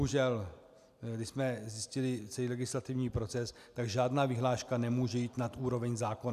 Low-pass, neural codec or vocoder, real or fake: 14.4 kHz; none; real